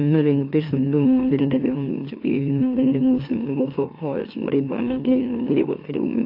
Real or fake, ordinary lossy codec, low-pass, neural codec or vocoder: fake; AAC, 32 kbps; 5.4 kHz; autoencoder, 44.1 kHz, a latent of 192 numbers a frame, MeloTTS